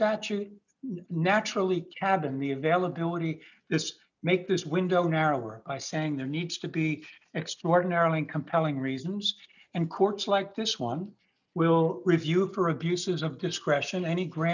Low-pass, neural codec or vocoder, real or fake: 7.2 kHz; none; real